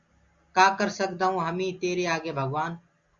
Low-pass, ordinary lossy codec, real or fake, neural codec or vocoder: 7.2 kHz; Opus, 64 kbps; real; none